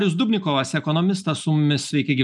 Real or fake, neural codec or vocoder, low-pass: real; none; 10.8 kHz